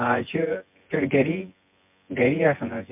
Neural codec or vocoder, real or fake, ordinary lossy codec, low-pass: vocoder, 24 kHz, 100 mel bands, Vocos; fake; MP3, 32 kbps; 3.6 kHz